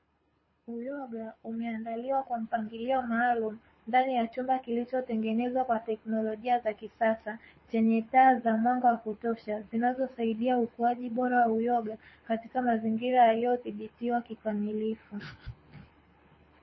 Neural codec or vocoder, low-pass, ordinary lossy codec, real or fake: codec, 24 kHz, 6 kbps, HILCodec; 7.2 kHz; MP3, 24 kbps; fake